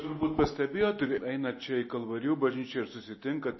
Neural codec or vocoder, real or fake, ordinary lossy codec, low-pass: none; real; MP3, 24 kbps; 7.2 kHz